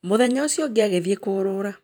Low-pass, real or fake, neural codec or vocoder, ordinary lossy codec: none; fake; vocoder, 44.1 kHz, 128 mel bands every 512 samples, BigVGAN v2; none